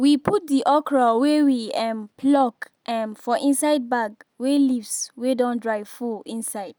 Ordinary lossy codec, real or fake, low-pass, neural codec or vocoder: none; real; none; none